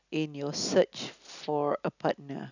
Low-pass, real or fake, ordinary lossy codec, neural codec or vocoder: 7.2 kHz; real; none; none